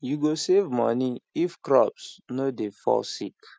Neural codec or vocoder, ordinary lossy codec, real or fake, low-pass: none; none; real; none